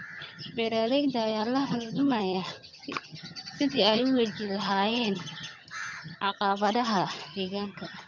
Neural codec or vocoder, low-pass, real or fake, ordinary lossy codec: vocoder, 22.05 kHz, 80 mel bands, HiFi-GAN; 7.2 kHz; fake; none